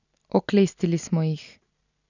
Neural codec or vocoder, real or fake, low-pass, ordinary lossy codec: none; real; 7.2 kHz; none